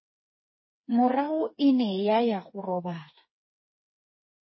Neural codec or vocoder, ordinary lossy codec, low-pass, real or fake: codec, 16 kHz, 4 kbps, FreqCodec, smaller model; MP3, 24 kbps; 7.2 kHz; fake